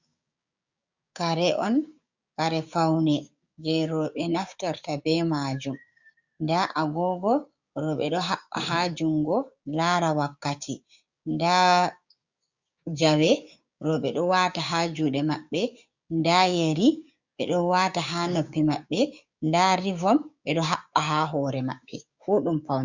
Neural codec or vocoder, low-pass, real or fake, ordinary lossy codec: codec, 16 kHz, 6 kbps, DAC; 7.2 kHz; fake; Opus, 64 kbps